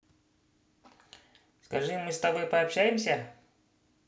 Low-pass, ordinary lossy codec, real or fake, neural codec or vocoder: none; none; real; none